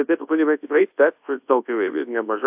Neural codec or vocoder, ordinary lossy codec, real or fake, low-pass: codec, 24 kHz, 0.9 kbps, WavTokenizer, large speech release; AAC, 32 kbps; fake; 3.6 kHz